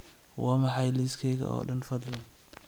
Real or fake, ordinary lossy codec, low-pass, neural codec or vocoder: fake; none; none; vocoder, 44.1 kHz, 128 mel bands every 512 samples, BigVGAN v2